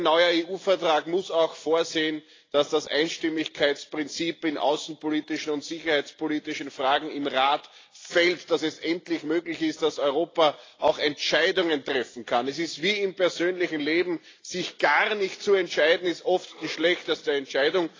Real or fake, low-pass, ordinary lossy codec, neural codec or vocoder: real; 7.2 kHz; AAC, 32 kbps; none